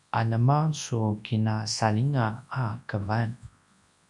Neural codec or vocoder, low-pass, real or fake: codec, 24 kHz, 0.9 kbps, WavTokenizer, large speech release; 10.8 kHz; fake